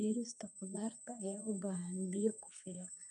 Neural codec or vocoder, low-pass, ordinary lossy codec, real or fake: codec, 32 kHz, 1.9 kbps, SNAC; 10.8 kHz; none; fake